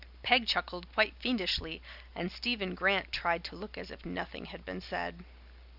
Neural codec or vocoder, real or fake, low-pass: none; real; 5.4 kHz